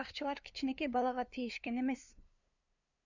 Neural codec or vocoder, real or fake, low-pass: codec, 16 kHz, 2 kbps, FunCodec, trained on LibriTTS, 25 frames a second; fake; 7.2 kHz